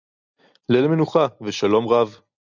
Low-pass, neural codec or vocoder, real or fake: 7.2 kHz; none; real